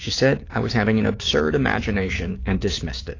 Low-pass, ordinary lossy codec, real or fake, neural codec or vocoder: 7.2 kHz; AAC, 32 kbps; fake; codec, 16 kHz, 6 kbps, DAC